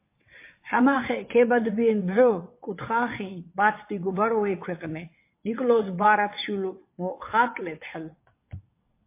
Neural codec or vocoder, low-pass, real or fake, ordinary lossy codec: vocoder, 22.05 kHz, 80 mel bands, WaveNeXt; 3.6 kHz; fake; MP3, 24 kbps